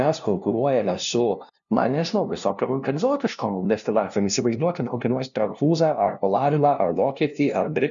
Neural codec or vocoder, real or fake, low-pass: codec, 16 kHz, 0.5 kbps, FunCodec, trained on LibriTTS, 25 frames a second; fake; 7.2 kHz